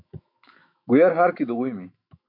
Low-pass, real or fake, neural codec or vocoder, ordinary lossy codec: 5.4 kHz; fake; autoencoder, 48 kHz, 128 numbers a frame, DAC-VAE, trained on Japanese speech; MP3, 48 kbps